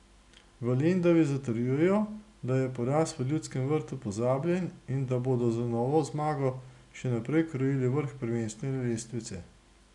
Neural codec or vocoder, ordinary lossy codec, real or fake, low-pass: none; none; real; 10.8 kHz